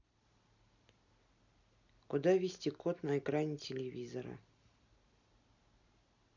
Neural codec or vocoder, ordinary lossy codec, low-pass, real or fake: none; none; 7.2 kHz; real